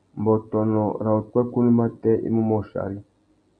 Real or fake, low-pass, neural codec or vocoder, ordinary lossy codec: real; 9.9 kHz; none; MP3, 96 kbps